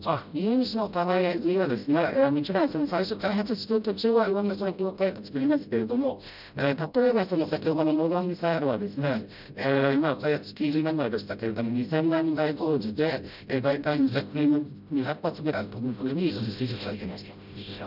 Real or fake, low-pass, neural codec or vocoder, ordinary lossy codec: fake; 5.4 kHz; codec, 16 kHz, 0.5 kbps, FreqCodec, smaller model; none